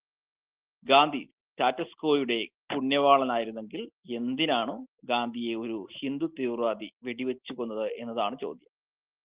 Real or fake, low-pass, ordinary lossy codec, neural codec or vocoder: real; 3.6 kHz; Opus, 16 kbps; none